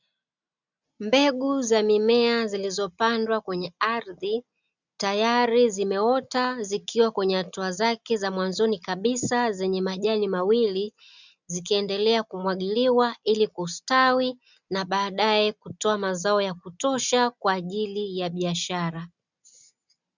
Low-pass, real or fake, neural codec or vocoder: 7.2 kHz; real; none